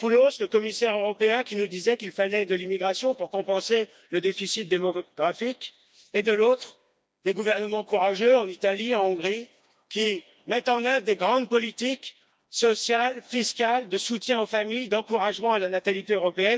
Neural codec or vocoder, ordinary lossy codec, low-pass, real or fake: codec, 16 kHz, 2 kbps, FreqCodec, smaller model; none; none; fake